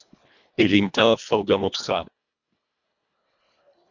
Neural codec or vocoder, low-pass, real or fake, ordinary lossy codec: codec, 24 kHz, 1.5 kbps, HILCodec; 7.2 kHz; fake; MP3, 64 kbps